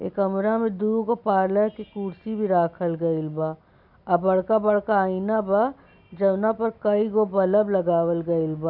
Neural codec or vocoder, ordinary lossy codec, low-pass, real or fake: none; none; 5.4 kHz; real